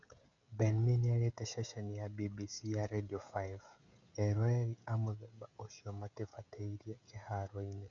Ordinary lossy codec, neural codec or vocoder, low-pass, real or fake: none; codec, 16 kHz, 16 kbps, FreqCodec, smaller model; 7.2 kHz; fake